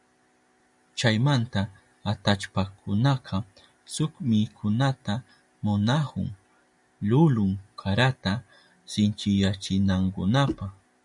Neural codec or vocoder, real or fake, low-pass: none; real; 10.8 kHz